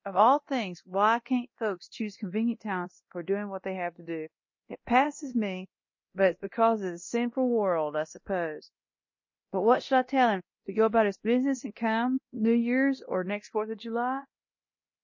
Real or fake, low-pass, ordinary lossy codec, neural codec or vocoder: fake; 7.2 kHz; MP3, 32 kbps; codec, 24 kHz, 0.9 kbps, DualCodec